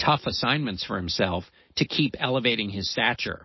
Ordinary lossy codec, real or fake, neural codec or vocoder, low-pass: MP3, 24 kbps; real; none; 7.2 kHz